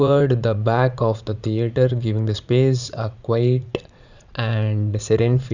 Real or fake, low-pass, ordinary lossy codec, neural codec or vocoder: fake; 7.2 kHz; none; vocoder, 44.1 kHz, 80 mel bands, Vocos